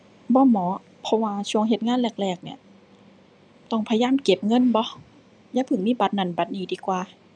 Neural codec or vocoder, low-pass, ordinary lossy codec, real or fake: none; 9.9 kHz; none; real